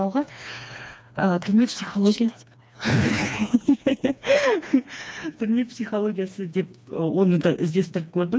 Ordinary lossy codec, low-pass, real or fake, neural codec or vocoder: none; none; fake; codec, 16 kHz, 2 kbps, FreqCodec, smaller model